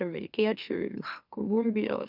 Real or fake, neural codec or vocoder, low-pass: fake; autoencoder, 44.1 kHz, a latent of 192 numbers a frame, MeloTTS; 5.4 kHz